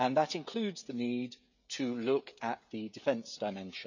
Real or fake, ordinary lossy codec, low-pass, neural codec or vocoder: fake; none; 7.2 kHz; codec, 16 kHz, 16 kbps, FreqCodec, smaller model